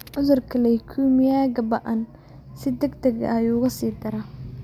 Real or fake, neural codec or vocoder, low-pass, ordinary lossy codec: real; none; 19.8 kHz; MP3, 96 kbps